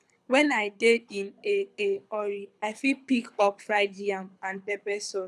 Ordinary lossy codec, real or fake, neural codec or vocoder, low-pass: none; fake; codec, 24 kHz, 6 kbps, HILCodec; none